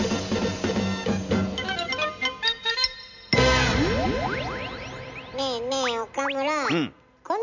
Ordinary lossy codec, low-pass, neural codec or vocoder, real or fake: none; 7.2 kHz; none; real